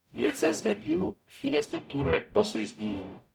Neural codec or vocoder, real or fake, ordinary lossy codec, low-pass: codec, 44.1 kHz, 0.9 kbps, DAC; fake; none; 19.8 kHz